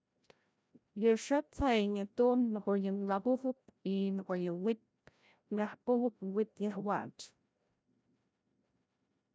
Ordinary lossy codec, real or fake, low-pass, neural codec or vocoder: none; fake; none; codec, 16 kHz, 0.5 kbps, FreqCodec, larger model